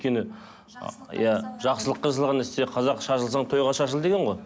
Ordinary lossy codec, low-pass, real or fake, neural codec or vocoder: none; none; real; none